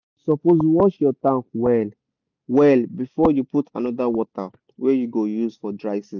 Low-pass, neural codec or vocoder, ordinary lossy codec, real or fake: 7.2 kHz; none; none; real